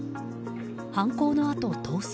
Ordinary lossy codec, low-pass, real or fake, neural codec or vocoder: none; none; real; none